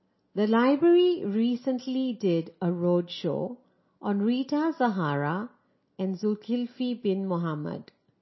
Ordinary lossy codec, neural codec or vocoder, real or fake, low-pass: MP3, 24 kbps; none; real; 7.2 kHz